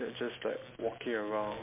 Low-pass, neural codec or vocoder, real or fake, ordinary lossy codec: 3.6 kHz; none; real; MP3, 32 kbps